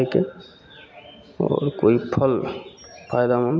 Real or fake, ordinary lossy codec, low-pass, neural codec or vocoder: real; none; none; none